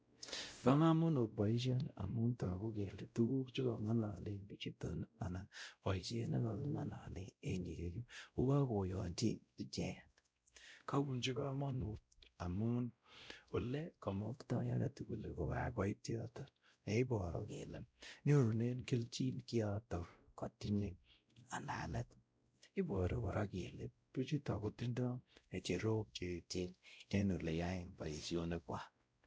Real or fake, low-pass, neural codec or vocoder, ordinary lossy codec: fake; none; codec, 16 kHz, 0.5 kbps, X-Codec, WavLM features, trained on Multilingual LibriSpeech; none